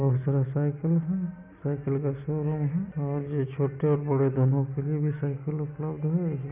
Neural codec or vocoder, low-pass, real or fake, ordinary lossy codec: none; 3.6 kHz; real; none